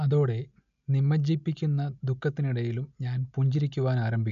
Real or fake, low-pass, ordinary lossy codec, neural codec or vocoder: real; 7.2 kHz; AAC, 96 kbps; none